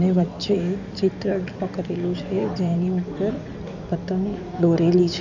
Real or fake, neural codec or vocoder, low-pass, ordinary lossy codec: fake; codec, 16 kHz in and 24 kHz out, 2.2 kbps, FireRedTTS-2 codec; 7.2 kHz; none